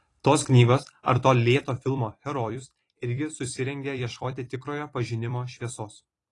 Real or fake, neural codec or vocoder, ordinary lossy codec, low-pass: fake; vocoder, 44.1 kHz, 128 mel bands every 256 samples, BigVGAN v2; AAC, 32 kbps; 10.8 kHz